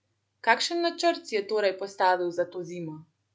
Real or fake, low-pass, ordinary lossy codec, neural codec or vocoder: real; none; none; none